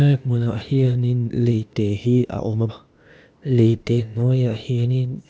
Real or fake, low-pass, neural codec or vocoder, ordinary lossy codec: fake; none; codec, 16 kHz, 0.8 kbps, ZipCodec; none